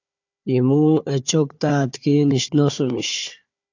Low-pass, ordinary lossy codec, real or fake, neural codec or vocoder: 7.2 kHz; AAC, 48 kbps; fake; codec, 16 kHz, 4 kbps, FunCodec, trained on Chinese and English, 50 frames a second